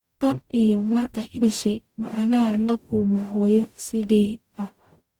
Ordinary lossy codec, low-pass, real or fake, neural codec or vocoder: Opus, 64 kbps; 19.8 kHz; fake; codec, 44.1 kHz, 0.9 kbps, DAC